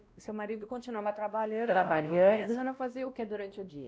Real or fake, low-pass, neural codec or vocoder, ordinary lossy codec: fake; none; codec, 16 kHz, 1 kbps, X-Codec, WavLM features, trained on Multilingual LibriSpeech; none